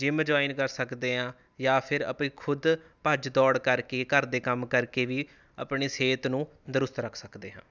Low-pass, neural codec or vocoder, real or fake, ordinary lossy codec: 7.2 kHz; none; real; none